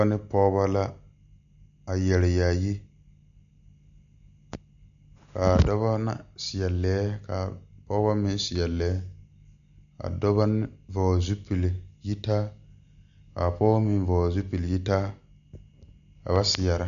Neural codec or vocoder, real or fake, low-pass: none; real; 7.2 kHz